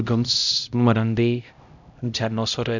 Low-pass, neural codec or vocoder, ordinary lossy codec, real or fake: 7.2 kHz; codec, 16 kHz, 0.5 kbps, X-Codec, HuBERT features, trained on LibriSpeech; none; fake